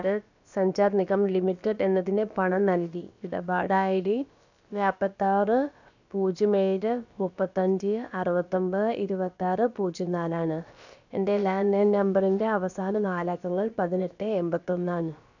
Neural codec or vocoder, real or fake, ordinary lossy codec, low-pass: codec, 16 kHz, about 1 kbps, DyCAST, with the encoder's durations; fake; none; 7.2 kHz